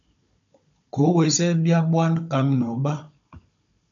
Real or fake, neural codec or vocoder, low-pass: fake; codec, 16 kHz, 16 kbps, FunCodec, trained on Chinese and English, 50 frames a second; 7.2 kHz